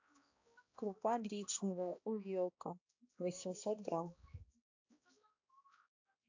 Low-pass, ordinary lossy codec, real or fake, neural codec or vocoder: 7.2 kHz; AAC, 48 kbps; fake; codec, 16 kHz, 1 kbps, X-Codec, HuBERT features, trained on balanced general audio